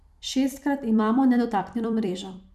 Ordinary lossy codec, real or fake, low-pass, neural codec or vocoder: none; fake; 14.4 kHz; vocoder, 44.1 kHz, 128 mel bands every 256 samples, BigVGAN v2